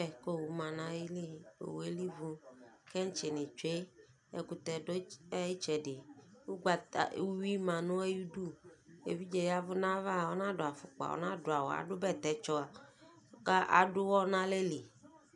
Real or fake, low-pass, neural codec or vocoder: real; 10.8 kHz; none